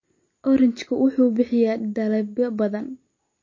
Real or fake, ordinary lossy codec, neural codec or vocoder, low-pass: real; MP3, 32 kbps; none; 7.2 kHz